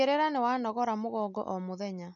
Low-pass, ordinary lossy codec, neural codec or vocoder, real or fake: 7.2 kHz; none; none; real